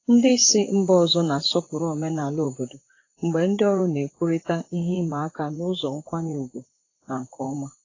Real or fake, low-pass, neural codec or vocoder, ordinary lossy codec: fake; 7.2 kHz; vocoder, 44.1 kHz, 128 mel bands, Pupu-Vocoder; AAC, 32 kbps